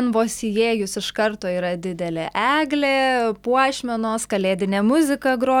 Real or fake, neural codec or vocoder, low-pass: real; none; 19.8 kHz